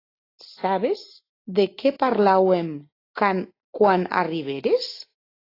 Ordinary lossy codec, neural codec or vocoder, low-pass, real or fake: AAC, 24 kbps; none; 5.4 kHz; real